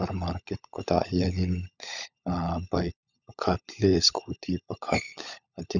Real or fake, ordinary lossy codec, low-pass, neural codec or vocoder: fake; none; 7.2 kHz; codec, 16 kHz, 16 kbps, FunCodec, trained on LibriTTS, 50 frames a second